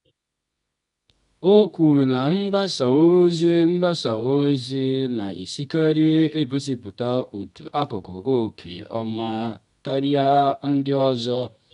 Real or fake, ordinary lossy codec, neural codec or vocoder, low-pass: fake; none; codec, 24 kHz, 0.9 kbps, WavTokenizer, medium music audio release; 10.8 kHz